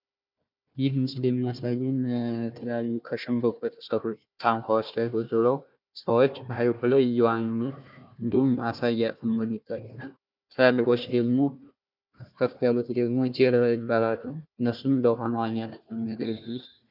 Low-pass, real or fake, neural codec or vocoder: 5.4 kHz; fake; codec, 16 kHz, 1 kbps, FunCodec, trained on Chinese and English, 50 frames a second